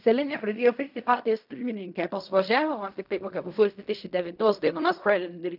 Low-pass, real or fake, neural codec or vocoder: 5.4 kHz; fake; codec, 16 kHz in and 24 kHz out, 0.4 kbps, LongCat-Audio-Codec, fine tuned four codebook decoder